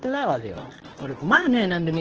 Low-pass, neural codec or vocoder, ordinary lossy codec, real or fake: 7.2 kHz; codec, 24 kHz, 0.9 kbps, WavTokenizer, medium speech release version 1; Opus, 16 kbps; fake